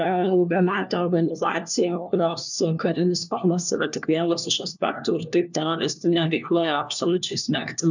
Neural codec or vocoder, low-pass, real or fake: codec, 16 kHz, 1 kbps, FunCodec, trained on LibriTTS, 50 frames a second; 7.2 kHz; fake